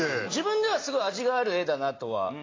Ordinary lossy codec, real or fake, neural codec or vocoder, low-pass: AAC, 32 kbps; real; none; 7.2 kHz